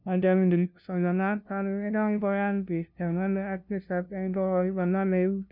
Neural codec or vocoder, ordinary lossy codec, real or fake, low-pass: codec, 16 kHz, 0.5 kbps, FunCodec, trained on LibriTTS, 25 frames a second; none; fake; 5.4 kHz